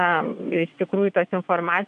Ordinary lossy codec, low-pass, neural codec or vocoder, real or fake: AAC, 96 kbps; 9.9 kHz; vocoder, 22.05 kHz, 80 mel bands, WaveNeXt; fake